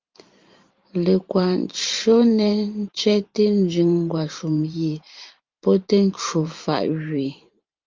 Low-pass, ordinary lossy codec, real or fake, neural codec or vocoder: 7.2 kHz; Opus, 24 kbps; fake; vocoder, 44.1 kHz, 128 mel bands every 512 samples, BigVGAN v2